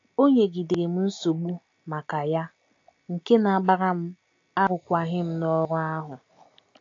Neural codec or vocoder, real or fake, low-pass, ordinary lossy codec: none; real; 7.2 kHz; AAC, 48 kbps